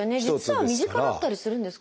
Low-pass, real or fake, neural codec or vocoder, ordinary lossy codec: none; real; none; none